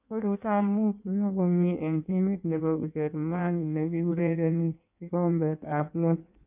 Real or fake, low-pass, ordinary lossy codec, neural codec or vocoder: fake; 3.6 kHz; none; codec, 16 kHz in and 24 kHz out, 1.1 kbps, FireRedTTS-2 codec